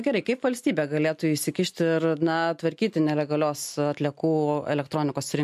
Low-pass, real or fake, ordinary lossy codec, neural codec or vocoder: 14.4 kHz; real; MP3, 64 kbps; none